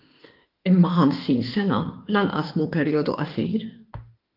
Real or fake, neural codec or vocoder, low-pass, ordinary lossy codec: fake; autoencoder, 48 kHz, 32 numbers a frame, DAC-VAE, trained on Japanese speech; 5.4 kHz; Opus, 32 kbps